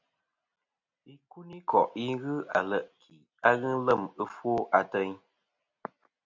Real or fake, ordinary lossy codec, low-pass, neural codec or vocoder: real; MP3, 64 kbps; 7.2 kHz; none